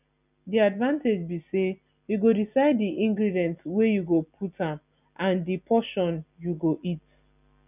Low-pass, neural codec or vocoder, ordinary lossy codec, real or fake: 3.6 kHz; none; none; real